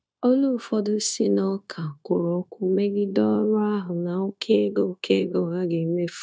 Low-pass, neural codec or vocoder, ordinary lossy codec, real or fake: none; codec, 16 kHz, 0.9 kbps, LongCat-Audio-Codec; none; fake